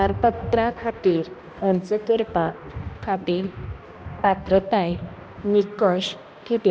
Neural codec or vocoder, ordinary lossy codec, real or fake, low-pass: codec, 16 kHz, 1 kbps, X-Codec, HuBERT features, trained on balanced general audio; none; fake; none